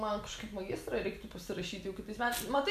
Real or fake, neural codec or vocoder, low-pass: real; none; 14.4 kHz